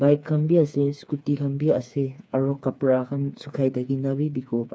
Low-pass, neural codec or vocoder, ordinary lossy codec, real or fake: none; codec, 16 kHz, 4 kbps, FreqCodec, smaller model; none; fake